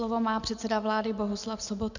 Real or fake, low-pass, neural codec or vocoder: real; 7.2 kHz; none